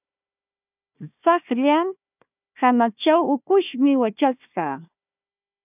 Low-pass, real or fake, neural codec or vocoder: 3.6 kHz; fake; codec, 16 kHz, 1 kbps, FunCodec, trained on Chinese and English, 50 frames a second